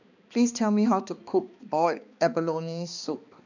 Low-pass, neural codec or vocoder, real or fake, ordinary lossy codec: 7.2 kHz; codec, 16 kHz, 2 kbps, X-Codec, HuBERT features, trained on balanced general audio; fake; none